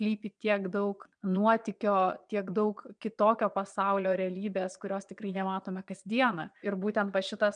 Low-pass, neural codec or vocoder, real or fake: 9.9 kHz; vocoder, 22.05 kHz, 80 mel bands, Vocos; fake